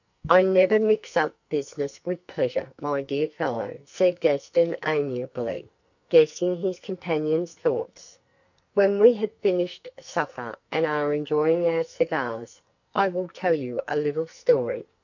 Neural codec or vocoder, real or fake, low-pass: codec, 44.1 kHz, 2.6 kbps, SNAC; fake; 7.2 kHz